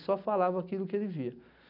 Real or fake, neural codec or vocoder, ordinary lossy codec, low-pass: real; none; none; 5.4 kHz